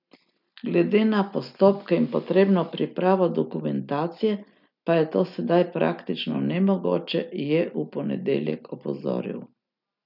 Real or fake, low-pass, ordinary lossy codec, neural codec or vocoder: real; 5.4 kHz; none; none